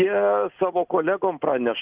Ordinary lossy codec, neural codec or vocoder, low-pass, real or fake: Opus, 32 kbps; none; 3.6 kHz; real